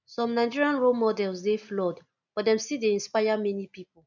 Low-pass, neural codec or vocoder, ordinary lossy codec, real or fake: 7.2 kHz; none; none; real